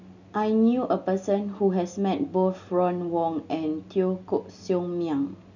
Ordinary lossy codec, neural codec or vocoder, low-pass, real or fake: none; none; 7.2 kHz; real